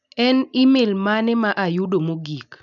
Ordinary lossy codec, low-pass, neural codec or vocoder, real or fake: none; 7.2 kHz; none; real